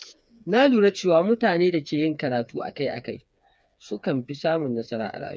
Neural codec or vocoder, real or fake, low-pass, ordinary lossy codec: codec, 16 kHz, 4 kbps, FreqCodec, smaller model; fake; none; none